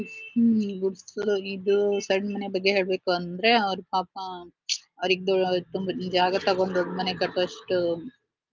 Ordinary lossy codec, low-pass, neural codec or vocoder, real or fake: Opus, 24 kbps; 7.2 kHz; none; real